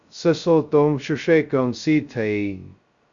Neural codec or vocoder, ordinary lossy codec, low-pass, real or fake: codec, 16 kHz, 0.2 kbps, FocalCodec; Opus, 64 kbps; 7.2 kHz; fake